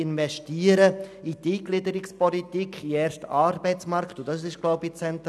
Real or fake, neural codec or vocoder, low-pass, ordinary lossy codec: real; none; none; none